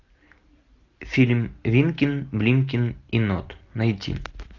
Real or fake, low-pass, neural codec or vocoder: real; 7.2 kHz; none